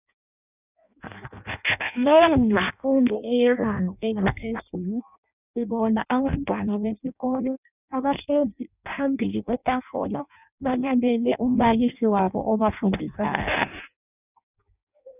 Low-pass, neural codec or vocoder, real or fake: 3.6 kHz; codec, 16 kHz in and 24 kHz out, 0.6 kbps, FireRedTTS-2 codec; fake